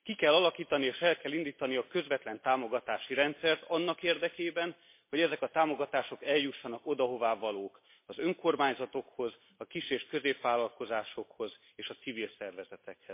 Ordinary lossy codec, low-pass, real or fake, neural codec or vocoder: MP3, 24 kbps; 3.6 kHz; real; none